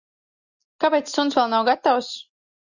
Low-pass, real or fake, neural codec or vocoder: 7.2 kHz; real; none